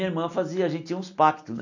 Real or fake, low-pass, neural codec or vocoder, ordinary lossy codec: real; 7.2 kHz; none; none